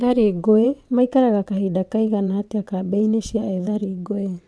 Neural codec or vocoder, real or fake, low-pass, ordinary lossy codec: vocoder, 22.05 kHz, 80 mel bands, WaveNeXt; fake; none; none